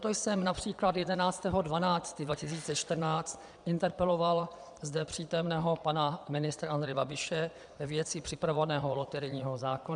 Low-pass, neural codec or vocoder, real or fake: 9.9 kHz; vocoder, 22.05 kHz, 80 mel bands, Vocos; fake